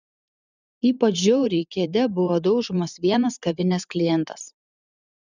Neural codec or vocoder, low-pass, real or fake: vocoder, 44.1 kHz, 128 mel bands every 512 samples, BigVGAN v2; 7.2 kHz; fake